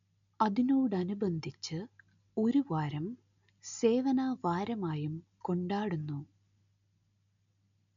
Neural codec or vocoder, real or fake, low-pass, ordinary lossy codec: none; real; 7.2 kHz; none